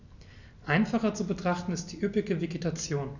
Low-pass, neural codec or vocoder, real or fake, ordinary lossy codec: 7.2 kHz; none; real; none